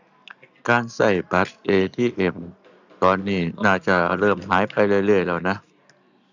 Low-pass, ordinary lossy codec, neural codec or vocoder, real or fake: 7.2 kHz; none; none; real